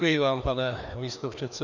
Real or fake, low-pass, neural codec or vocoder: fake; 7.2 kHz; codec, 16 kHz, 2 kbps, FreqCodec, larger model